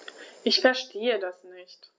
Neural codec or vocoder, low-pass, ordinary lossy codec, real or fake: none; none; none; real